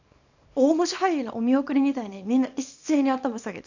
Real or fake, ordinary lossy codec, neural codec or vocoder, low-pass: fake; none; codec, 24 kHz, 0.9 kbps, WavTokenizer, small release; 7.2 kHz